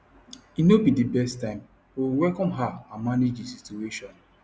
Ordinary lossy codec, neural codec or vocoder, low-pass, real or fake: none; none; none; real